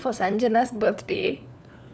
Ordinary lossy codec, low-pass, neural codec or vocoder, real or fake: none; none; codec, 16 kHz, 4 kbps, FunCodec, trained on LibriTTS, 50 frames a second; fake